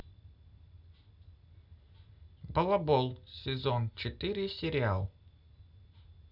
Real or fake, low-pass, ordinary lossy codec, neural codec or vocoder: real; 5.4 kHz; none; none